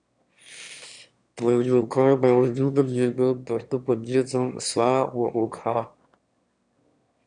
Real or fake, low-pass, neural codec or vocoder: fake; 9.9 kHz; autoencoder, 22.05 kHz, a latent of 192 numbers a frame, VITS, trained on one speaker